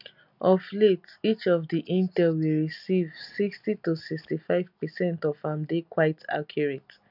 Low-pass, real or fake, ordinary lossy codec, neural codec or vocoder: 5.4 kHz; real; none; none